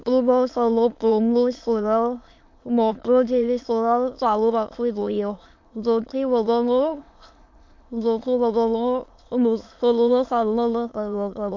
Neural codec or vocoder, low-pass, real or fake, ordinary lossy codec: autoencoder, 22.05 kHz, a latent of 192 numbers a frame, VITS, trained on many speakers; 7.2 kHz; fake; MP3, 48 kbps